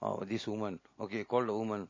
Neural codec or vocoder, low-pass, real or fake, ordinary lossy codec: none; 7.2 kHz; real; MP3, 32 kbps